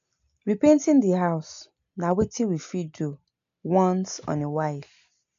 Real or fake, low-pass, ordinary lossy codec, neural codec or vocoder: real; 7.2 kHz; AAC, 64 kbps; none